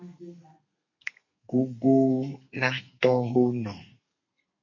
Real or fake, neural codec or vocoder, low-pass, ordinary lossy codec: fake; codec, 32 kHz, 1.9 kbps, SNAC; 7.2 kHz; MP3, 32 kbps